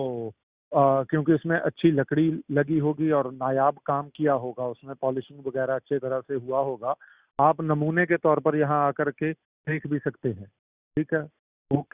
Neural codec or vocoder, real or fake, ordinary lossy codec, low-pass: none; real; Opus, 64 kbps; 3.6 kHz